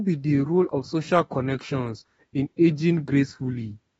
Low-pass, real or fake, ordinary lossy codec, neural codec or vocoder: 19.8 kHz; fake; AAC, 24 kbps; autoencoder, 48 kHz, 32 numbers a frame, DAC-VAE, trained on Japanese speech